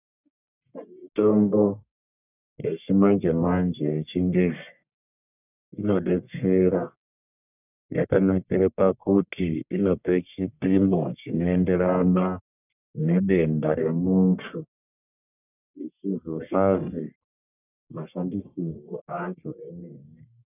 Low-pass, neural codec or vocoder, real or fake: 3.6 kHz; codec, 44.1 kHz, 1.7 kbps, Pupu-Codec; fake